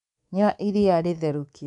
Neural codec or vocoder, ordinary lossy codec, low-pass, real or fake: codec, 24 kHz, 3.1 kbps, DualCodec; none; 10.8 kHz; fake